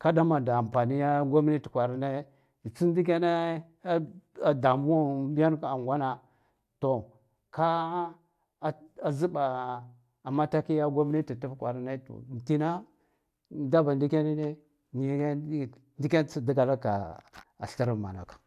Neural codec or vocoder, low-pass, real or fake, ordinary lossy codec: none; 14.4 kHz; real; AAC, 96 kbps